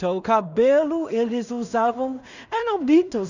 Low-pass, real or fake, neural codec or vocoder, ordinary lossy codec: 7.2 kHz; fake; codec, 16 kHz in and 24 kHz out, 0.4 kbps, LongCat-Audio-Codec, two codebook decoder; none